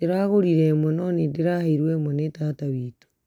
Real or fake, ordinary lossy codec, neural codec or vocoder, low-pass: real; none; none; 19.8 kHz